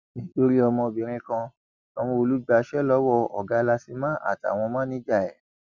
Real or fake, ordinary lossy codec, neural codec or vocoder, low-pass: real; none; none; 7.2 kHz